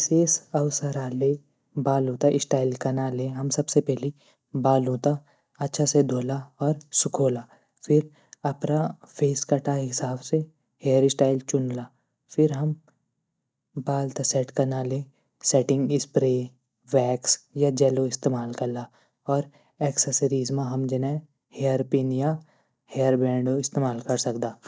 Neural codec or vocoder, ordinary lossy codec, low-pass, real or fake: none; none; none; real